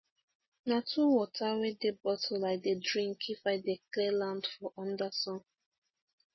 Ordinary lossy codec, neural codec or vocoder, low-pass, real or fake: MP3, 24 kbps; none; 7.2 kHz; real